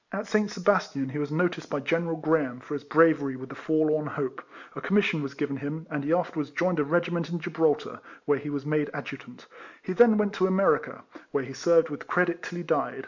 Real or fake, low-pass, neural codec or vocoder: real; 7.2 kHz; none